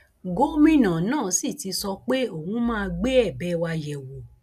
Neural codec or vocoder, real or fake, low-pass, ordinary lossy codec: none; real; 14.4 kHz; none